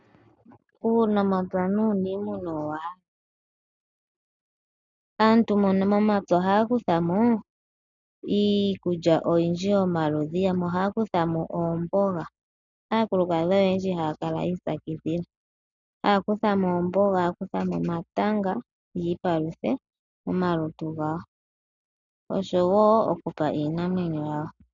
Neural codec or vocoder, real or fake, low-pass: none; real; 7.2 kHz